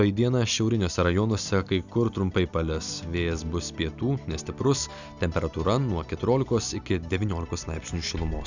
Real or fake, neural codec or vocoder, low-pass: real; none; 7.2 kHz